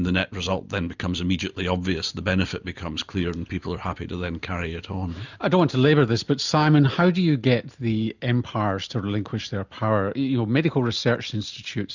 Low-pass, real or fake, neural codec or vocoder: 7.2 kHz; real; none